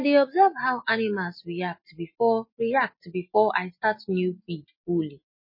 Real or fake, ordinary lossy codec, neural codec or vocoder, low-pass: real; MP3, 24 kbps; none; 5.4 kHz